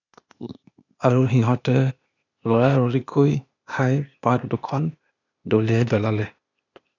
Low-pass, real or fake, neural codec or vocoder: 7.2 kHz; fake; codec, 16 kHz, 0.8 kbps, ZipCodec